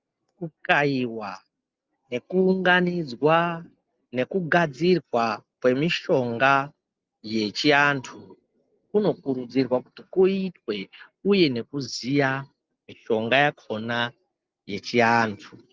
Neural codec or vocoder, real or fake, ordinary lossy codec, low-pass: none; real; Opus, 32 kbps; 7.2 kHz